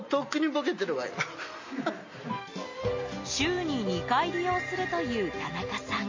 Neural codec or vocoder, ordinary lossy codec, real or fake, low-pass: none; MP3, 32 kbps; real; 7.2 kHz